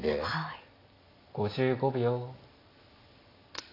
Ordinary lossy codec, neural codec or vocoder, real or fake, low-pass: AAC, 24 kbps; none; real; 5.4 kHz